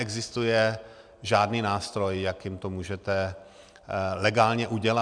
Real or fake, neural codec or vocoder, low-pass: real; none; 9.9 kHz